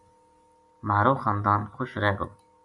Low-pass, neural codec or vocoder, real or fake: 10.8 kHz; none; real